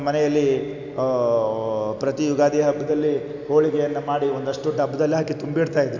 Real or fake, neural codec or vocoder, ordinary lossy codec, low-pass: real; none; none; 7.2 kHz